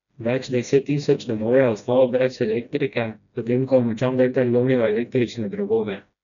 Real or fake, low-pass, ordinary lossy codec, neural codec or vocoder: fake; 7.2 kHz; none; codec, 16 kHz, 1 kbps, FreqCodec, smaller model